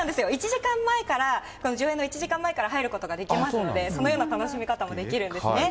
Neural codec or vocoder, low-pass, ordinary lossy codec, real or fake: none; none; none; real